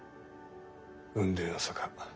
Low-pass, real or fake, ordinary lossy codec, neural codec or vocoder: none; real; none; none